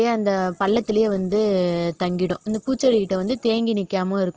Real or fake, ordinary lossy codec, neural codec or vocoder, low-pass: real; Opus, 16 kbps; none; 7.2 kHz